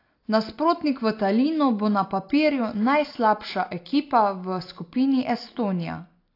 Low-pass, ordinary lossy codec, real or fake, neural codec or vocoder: 5.4 kHz; AAC, 32 kbps; real; none